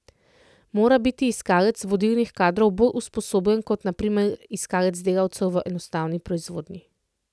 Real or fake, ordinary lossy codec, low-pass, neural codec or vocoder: real; none; none; none